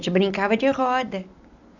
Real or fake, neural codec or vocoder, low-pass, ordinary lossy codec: real; none; 7.2 kHz; none